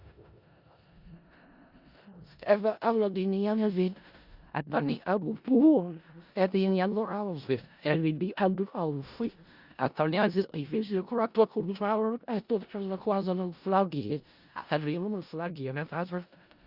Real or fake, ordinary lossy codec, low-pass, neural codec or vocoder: fake; Opus, 64 kbps; 5.4 kHz; codec, 16 kHz in and 24 kHz out, 0.4 kbps, LongCat-Audio-Codec, four codebook decoder